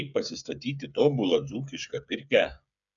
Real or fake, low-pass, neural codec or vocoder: fake; 7.2 kHz; codec, 16 kHz, 8 kbps, FreqCodec, smaller model